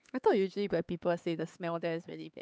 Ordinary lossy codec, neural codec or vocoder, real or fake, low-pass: none; codec, 16 kHz, 4 kbps, X-Codec, HuBERT features, trained on LibriSpeech; fake; none